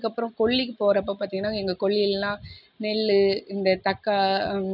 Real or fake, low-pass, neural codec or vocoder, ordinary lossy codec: real; 5.4 kHz; none; none